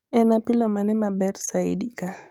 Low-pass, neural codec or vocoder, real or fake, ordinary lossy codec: 19.8 kHz; codec, 44.1 kHz, 7.8 kbps, DAC; fake; none